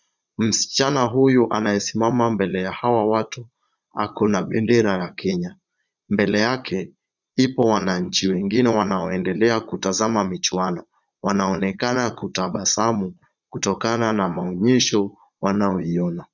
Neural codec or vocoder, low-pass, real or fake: vocoder, 22.05 kHz, 80 mel bands, Vocos; 7.2 kHz; fake